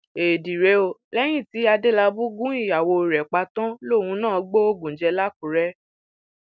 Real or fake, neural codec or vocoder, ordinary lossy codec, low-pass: real; none; none; none